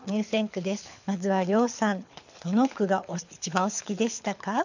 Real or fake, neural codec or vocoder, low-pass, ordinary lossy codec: fake; codec, 24 kHz, 6 kbps, HILCodec; 7.2 kHz; none